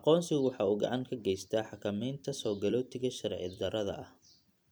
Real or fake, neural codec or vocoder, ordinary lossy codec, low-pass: fake; vocoder, 44.1 kHz, 128 mel bands every 256 samples, BigVGAN v2; none; none